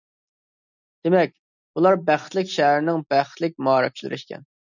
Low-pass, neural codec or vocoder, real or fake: 7.2 kHz; none; real